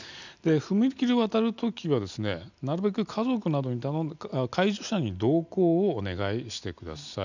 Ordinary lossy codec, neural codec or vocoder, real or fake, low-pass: none; none; real; 7.2 kHz